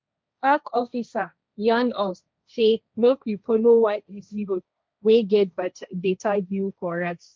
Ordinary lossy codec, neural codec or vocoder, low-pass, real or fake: none; codec, 16 kHz, 1.1 kbps, Voila-Tokenizer; none; fake